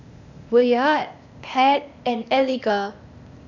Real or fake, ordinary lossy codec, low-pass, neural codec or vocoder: fake; none; 7.2 kHz; codec, 16 kHz, 0.8 kbps, ZipCodec